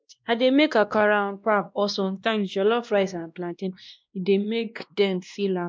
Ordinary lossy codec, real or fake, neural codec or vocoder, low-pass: none; fake; codec, 16 kHz, 1 kbps, X-Codec, WavLM features, trained on Multilingual LibriSpeech; none